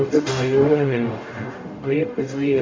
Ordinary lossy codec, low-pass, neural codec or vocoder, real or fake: MP3, 48 kbps; 7.2 kHz; codec, 44.1 kHz, 0.9 kbps, DAC; fake